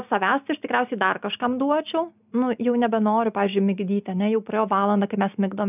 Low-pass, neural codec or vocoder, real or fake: 3.6 kHz; none; real